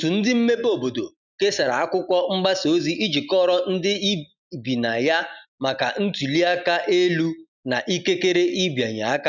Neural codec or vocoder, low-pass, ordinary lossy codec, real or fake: none; 7.2 kHz; none; real